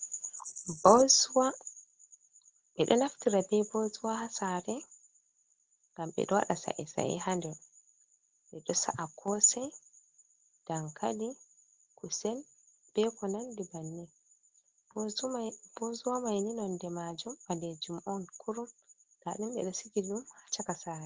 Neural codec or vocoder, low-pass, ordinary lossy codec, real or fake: none; 7.2 kHz; Opus, 16 kbps; real